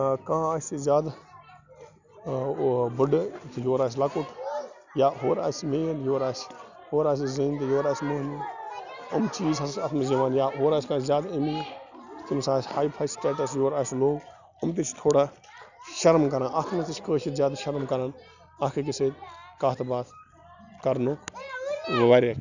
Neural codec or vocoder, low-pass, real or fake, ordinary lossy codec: none; 7.2 kHz; real; none